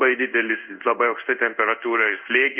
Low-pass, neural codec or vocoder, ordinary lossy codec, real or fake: 3.6 kHz; codec, 16 kHz in and 24 kHz out, 1 kbps, XY-Tokenizer; Opus, 24 kbps; fake